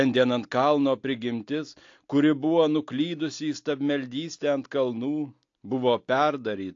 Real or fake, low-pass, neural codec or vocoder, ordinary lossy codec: real; 7.2 kHz; none; AAC, 48 kbps